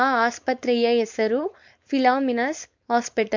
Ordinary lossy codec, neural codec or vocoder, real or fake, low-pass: MP3, 48 kbps; codec, 16 kHz, 4.8 kbps, FACodec; fake; 7.2 kHz